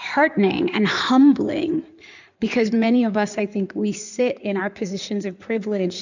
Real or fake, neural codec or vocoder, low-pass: fake; codec, 16 kHz in and 24 kHz out, 2.2 kbps, FireRedTTS-2 codec; 7.2 kHz